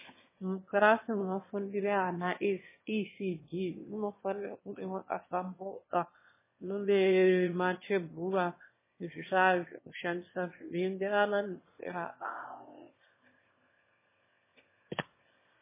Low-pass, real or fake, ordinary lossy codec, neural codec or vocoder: 3.6 kHz; fake; MP3, 16 kbps; autoencoder, 22.05 kHz, a latent of 192 numbers a frame, VITS, trained on one speaker